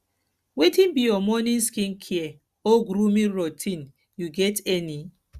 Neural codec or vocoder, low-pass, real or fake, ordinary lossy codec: none; 14.4 kHz; real; Opus, 64 kbps